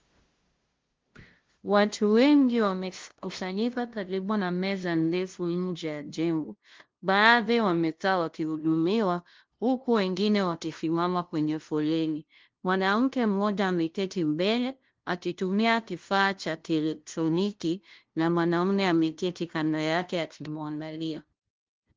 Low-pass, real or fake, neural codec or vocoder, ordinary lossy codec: 7.2 kHz; fake; codec, 16 kHz, 0.5 kbps, FunCodec, trained on LibriTTS, 25 frames a second; Opus, 16 kbps